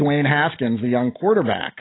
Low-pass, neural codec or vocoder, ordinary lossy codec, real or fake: 7.2 kHz; none; AAC, 16 kbps; real